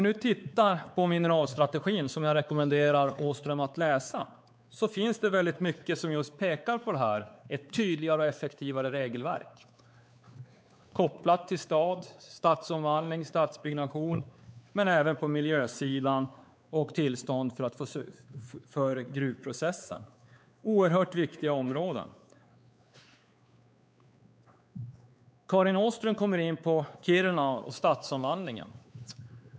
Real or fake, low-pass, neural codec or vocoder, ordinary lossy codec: fake; none; codec, 16 kHz, 4 kbps, X-Codec, WavLM features, trained on Multilingual LibriSpeech; none